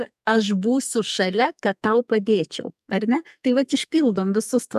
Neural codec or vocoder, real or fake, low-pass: codec, 44.1 kHz, 2.6 kbps, SNAC; fake; 14.4 kHz